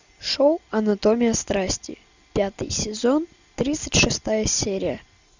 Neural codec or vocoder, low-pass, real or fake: none; 7.2 kHz; real